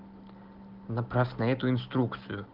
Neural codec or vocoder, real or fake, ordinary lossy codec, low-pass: none; real; Opus, 16 kbps; 5.4 kHz